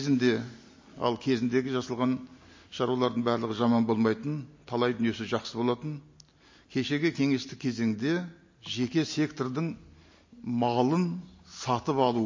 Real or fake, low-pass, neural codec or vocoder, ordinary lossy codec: real; 7.2 kHz; none; MP3, 32 kbps